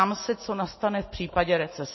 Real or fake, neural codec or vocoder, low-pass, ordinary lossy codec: real; none; 7.2 kHz; MP3, 24 kbps